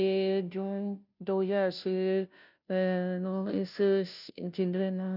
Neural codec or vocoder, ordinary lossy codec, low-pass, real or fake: codec, 16 kHz, 0.5 kbps, FunCodec, trained on Chinese and English, 25 frames a second; none; 5.4 kHz; fake